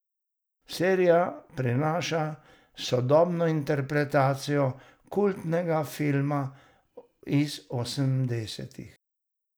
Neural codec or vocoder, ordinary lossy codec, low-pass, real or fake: none; none; none; real